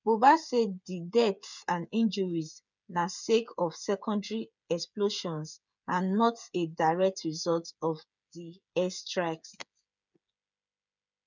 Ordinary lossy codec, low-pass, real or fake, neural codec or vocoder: none; 7.2 kHz; fake; codec, 16 kHz, 8 kbps, FreqCodec, smaller model